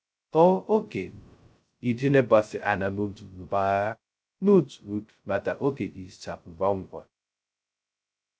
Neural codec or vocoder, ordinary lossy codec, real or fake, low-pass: codec, 16 kHz, 0.2 kbps, FocalCodec; none; fake; none